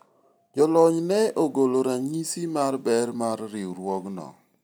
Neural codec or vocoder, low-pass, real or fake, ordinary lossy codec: none; none; real; none